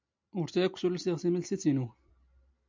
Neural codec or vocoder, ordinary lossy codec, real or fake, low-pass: none; MP3, 64 kbps; real; 7.2 kHz